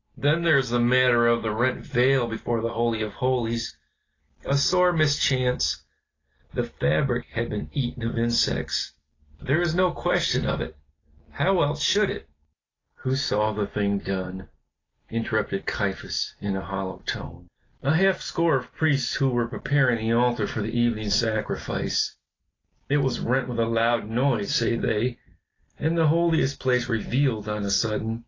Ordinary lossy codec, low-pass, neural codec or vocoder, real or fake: AAC, 32 kbps; 7.2 kHz; none; real